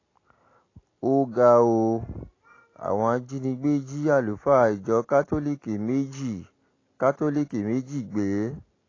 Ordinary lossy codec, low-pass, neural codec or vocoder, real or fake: AAC, 32 kbps; 7.2 kHz; none; real